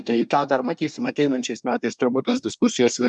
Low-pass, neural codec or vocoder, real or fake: 10.8 kHz; codec, 24 kHz, 1 kbps, SNAC; fake